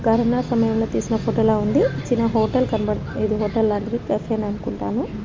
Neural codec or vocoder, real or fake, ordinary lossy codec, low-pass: none; real; Opus, 32 kbps; 7.2 kHz